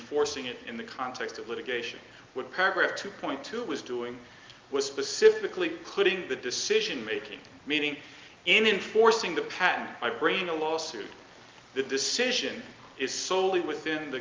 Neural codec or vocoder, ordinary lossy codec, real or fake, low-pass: none; Opus, 32 kbps; real; 7.2 kHz